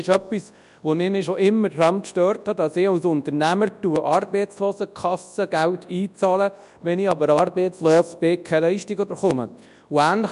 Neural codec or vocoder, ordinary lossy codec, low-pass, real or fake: codec, 24 kHz, 0.9 kbps, WavTokenizer, large speech release; none; 10.8 kHz; fake